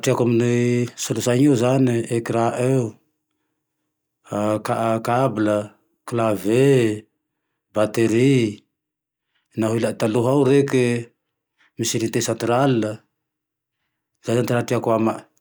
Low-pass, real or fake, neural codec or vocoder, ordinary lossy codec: none; real; none; none